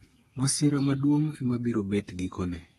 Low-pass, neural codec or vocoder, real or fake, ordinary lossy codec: 14.4 kHz; codec, 32 kHz, 1.9 kbps, SNAC; fake; AAC, 32 kbps